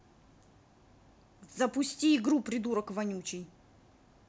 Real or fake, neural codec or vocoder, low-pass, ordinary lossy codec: real; none; none; none